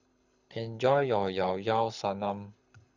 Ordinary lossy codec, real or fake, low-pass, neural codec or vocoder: Opus, 64 kbps; fake; 7.2 kHz; codec, 24 kHz, 6 kbps, HILCodec